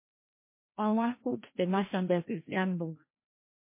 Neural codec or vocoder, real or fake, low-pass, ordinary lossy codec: codec, 16 kHz, 1 kbps, FreqCodec, larger model; fake; 3.6 kHz; MP3, 24 kbps